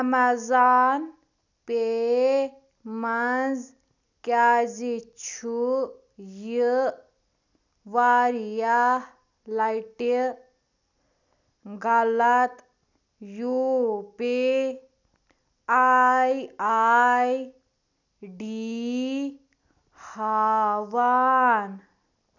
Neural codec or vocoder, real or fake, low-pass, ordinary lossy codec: none; real; 7.2 kHz; none